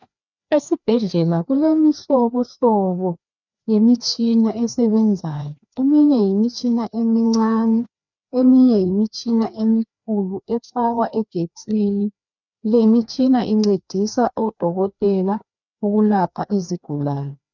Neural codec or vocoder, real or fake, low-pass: codec, 16 kHz, 2 kbps, FreqCodec, larger model; fake; 7.2 kHz